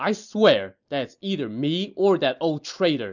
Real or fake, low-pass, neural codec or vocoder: real; 7.2 kHz; none